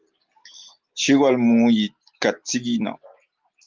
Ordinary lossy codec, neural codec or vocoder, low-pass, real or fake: Opus, 32 kbps; none; 7.2 kHz; real